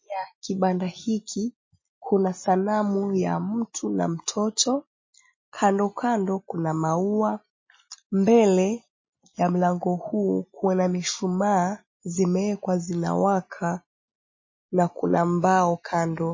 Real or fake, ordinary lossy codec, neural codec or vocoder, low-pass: real; MP3, 32 kbps; none; 7.2 kHz